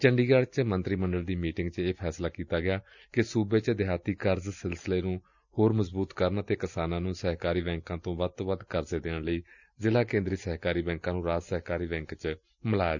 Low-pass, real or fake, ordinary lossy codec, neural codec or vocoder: 7.2 kHz; real; none; none